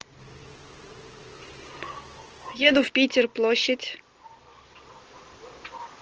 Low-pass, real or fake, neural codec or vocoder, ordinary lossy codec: 7.2 kHz; real; none; Opus, 16 kbps